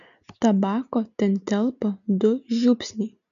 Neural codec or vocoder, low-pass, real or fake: none; 7.2 kHz; real